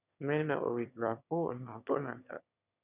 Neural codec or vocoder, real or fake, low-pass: autoencoder, 22.05 kHz, a latent of 192 numbers a frame, VITS, trained on one speaker; fake; 3.6 kHz